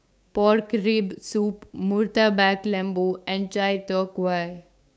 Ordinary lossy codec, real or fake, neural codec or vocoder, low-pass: none; fake; codec, 16 kHz, 6 kbps, DAC; none